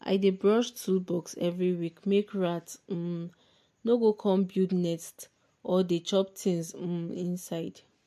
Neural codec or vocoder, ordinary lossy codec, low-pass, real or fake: none; MP3, 64 kbps; 14.4 kHz; real